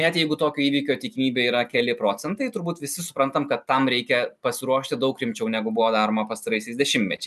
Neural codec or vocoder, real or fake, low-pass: none; real; 14.4 kHz